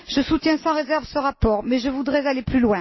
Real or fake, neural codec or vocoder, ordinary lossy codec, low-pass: real; none; MP3, 24 kbps; 7.2 kHz